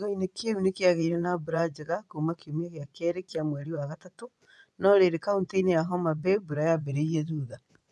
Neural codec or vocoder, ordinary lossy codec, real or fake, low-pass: vocoder, 24 kHz, 100 mel bands, Vocos; none; fake; none